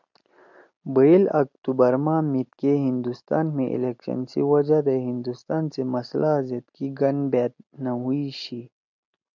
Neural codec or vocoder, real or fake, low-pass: none; real; 7.2 kHz